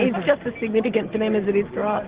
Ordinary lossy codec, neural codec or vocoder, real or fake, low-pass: Opus, 16 kbps; none; real; 3.6 kHz